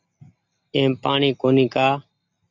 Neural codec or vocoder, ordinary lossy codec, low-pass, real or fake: none; MP3, 64 kbps; 7.2 kHz; real